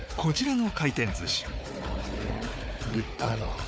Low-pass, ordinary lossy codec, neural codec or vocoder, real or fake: none; none; codec, 16 kHz, 4 kbps, FunCodec, trained on Chinese and English, 50 frames a second; fake